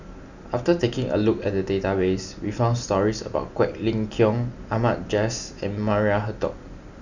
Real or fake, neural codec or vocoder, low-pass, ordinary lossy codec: real; none; 7.2 kHz; none